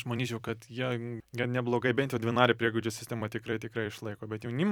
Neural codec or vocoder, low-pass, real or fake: vocoder, 44.1 kHz, 128 mel bands every 256 samples, BigVGAN v2; 19.8 kHz; fake